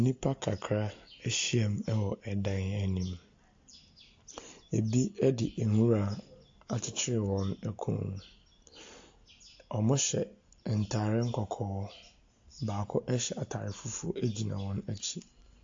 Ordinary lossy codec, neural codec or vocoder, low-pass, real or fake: AAC, 48 kbps; none; 7.2 kHz; real